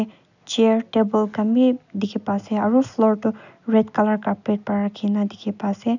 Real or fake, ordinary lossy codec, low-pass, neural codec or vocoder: real; none; 7.2 kHz; none